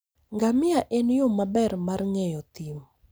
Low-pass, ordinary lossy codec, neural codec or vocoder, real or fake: none; none; none; real